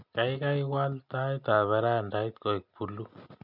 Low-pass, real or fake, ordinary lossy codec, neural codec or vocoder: 5.4 kHz; real; none; none